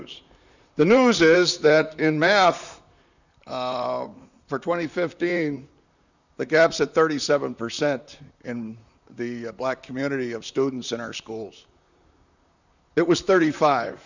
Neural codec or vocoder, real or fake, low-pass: vocoder, 44.1 kHz, 128 mel bands, Pupu-Vocoder; fake; 7.2 kHz